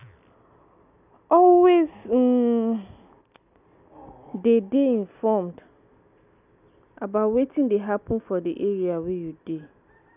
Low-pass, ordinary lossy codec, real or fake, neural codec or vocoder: 3.6 kHz; none; real; none